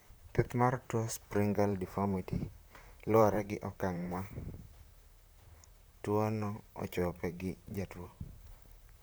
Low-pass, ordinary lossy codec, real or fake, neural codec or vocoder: none; none; fake; vocoder, 44.1 kHz, 128 mel bands, Pupu-Vocoder